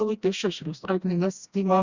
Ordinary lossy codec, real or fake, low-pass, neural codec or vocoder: Opus, 64 kbps; fake; 7.2 kHz; codec, 16 kHz, 1 kbps, FreqCodec, smaller model